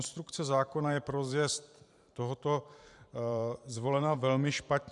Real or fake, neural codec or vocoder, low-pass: fake; vocoder, 44.1 kHz, 128 mel bands every 512 samples, BigVGAN v2; 10.8 kHz